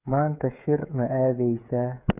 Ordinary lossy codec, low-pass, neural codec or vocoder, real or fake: none; 3.6 kHz; codec, 16 kHz, 8 kbps, FreqCodec, smaller model; fake